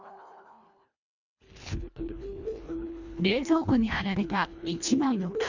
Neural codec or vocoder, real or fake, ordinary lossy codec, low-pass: codec, 24 kHz, 1.5 kbps, HILCodec; fake; none; 7.2 kHz